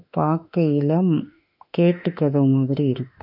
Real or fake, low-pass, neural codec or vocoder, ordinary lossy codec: fake; 5.4 kHz; autoencoder, 48 kHz, 32 numbers a frame, DAC-VAE, trained on Japanese speech; none